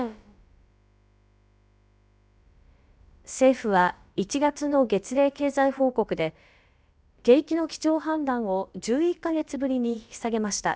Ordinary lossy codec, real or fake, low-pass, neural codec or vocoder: none; fake; none; codec, 16 kHz, about 1 kbps, DyCAST, with the encoder's durations